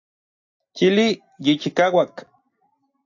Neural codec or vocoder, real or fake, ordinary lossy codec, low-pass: none; real; AAC, 48 kbps; 7.2 kHz